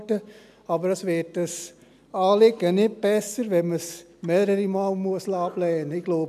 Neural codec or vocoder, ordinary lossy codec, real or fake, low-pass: none; none; real; 14.4 kHz